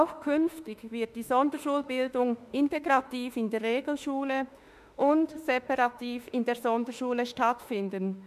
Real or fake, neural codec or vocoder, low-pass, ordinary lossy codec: fake; autoencoder, 48 kHz, 32 numbers a frame, DAC-VAE, trained on Japanese speech; 14.4 kHz; none